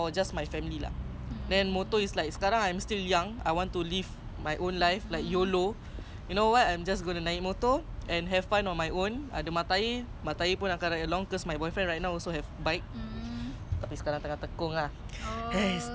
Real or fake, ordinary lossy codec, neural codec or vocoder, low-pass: real; none; none; none